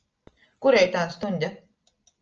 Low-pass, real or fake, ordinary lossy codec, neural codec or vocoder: 7.2 kHz; real; Opus, 24 kbps; none